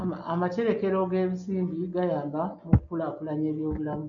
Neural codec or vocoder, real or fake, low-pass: none; real; 7.2 kHz